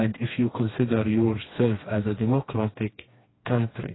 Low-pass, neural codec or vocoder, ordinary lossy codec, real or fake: 7.2 kHz; codec, 16 kHz, 2 kbps, FreqCodec, smaller model; AAC, 16 kbps; fake